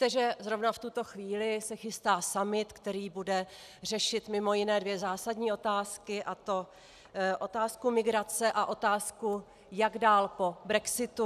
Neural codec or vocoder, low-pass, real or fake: none; 14.4 kHz; real